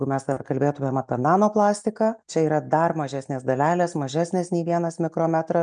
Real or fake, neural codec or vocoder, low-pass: real; none; 9.9 kHz